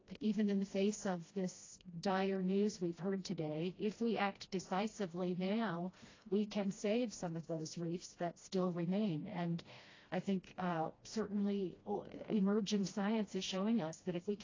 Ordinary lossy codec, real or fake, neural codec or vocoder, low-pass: AAC, 32 kbps; fake; codec, 16 kHz, 1 kbps, FreqCodec, smaller model; 7.2 kHz